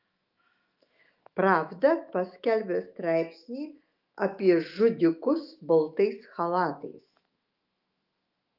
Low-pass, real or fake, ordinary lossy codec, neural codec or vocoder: 5.4 kHz; fake; Opus, 24 kbps; autoencoder, 48 kHz, 128 numbers a frame, DAC-VAE, trained on Japanese speech